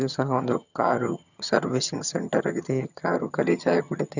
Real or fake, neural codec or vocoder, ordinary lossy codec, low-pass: fake; vocoder, 22.05 kHz, 80 mel bands, HiFi-GAN; none; 7.2 kHz